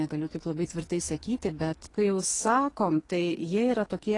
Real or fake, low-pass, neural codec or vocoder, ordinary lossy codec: fake; 10.8 kHz; codec, 44.1 kHz, 2.6 kbps, SNAC; AAC, 32 kbps